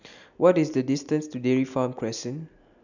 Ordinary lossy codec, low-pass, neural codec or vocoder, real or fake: none; 7.2 kHz; none; real